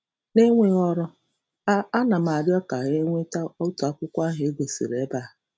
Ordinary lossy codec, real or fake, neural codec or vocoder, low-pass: none; real; none; none